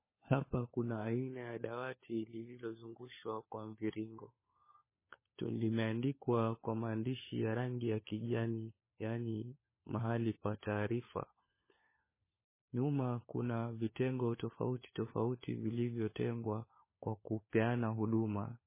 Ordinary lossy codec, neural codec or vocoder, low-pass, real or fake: MP3, 16 kbps; codec, 16 kHz, 4 kbps, FunCodec, trained on LibriTTS, 50 frames a second; 3.6 kHz; fake